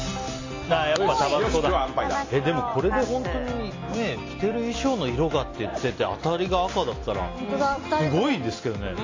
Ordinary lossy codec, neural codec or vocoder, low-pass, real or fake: AAC, 32 kbps; none; 7.2 kHz; real